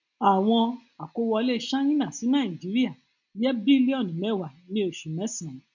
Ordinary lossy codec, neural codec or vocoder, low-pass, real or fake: none; none; 7.2 kHz; real